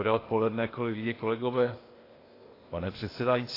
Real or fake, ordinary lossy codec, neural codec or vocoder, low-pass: fake; AAC, 24 kbps; codec, 16 kHz, 0.8 kbps, ZipCodec; 5.4 kHz